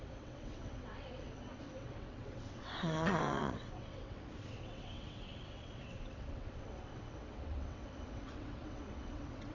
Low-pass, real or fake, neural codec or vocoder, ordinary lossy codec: 7.2 kHz; fake; vocoder, 22.05 kHz, 80 mel bands, WaveNeXt; none